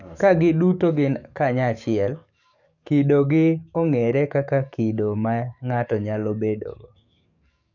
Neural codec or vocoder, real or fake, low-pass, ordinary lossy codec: codec, 44.1 kHz, 7.8 kbps, DAC; fake; 7.2 kHz; none